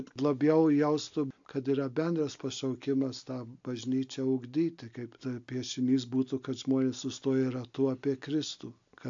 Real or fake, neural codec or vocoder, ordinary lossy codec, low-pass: real; none; AAC, 64 kbps; 7.2 kHz